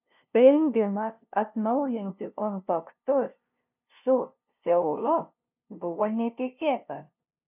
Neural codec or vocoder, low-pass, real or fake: codec, 16 kHz, 0.5 kbps, FunCodec, trained on LibriTTS, 25 frames a second; 3.6 kHz; fake